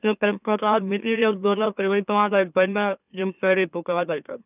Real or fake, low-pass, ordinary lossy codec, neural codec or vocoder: fake; 3.6 kHz; none; autoencoder, 44.1 kHz, a latent of 192 numbers a frame, MeloTTS